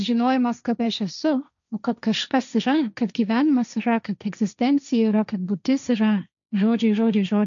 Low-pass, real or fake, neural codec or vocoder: 7.2 kHz; fake; codec, 16 kHz, 1.1 kbps, Voila-Tokenizer